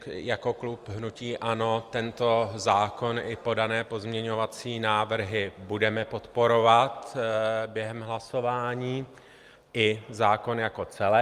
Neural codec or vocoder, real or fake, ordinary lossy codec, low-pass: none; real; Opus, 32 kbps; 14.4 kHz